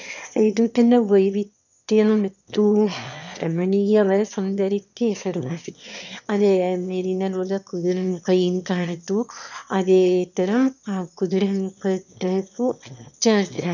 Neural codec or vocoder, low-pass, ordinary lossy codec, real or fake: autoencoder, 22.05 kHz, a latent of 192 numbers a frame, VITS, trained on one speaker; 7.2 kHz; none; fake